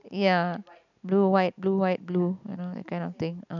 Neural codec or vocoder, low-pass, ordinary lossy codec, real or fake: none; 7.2 kHz; none; real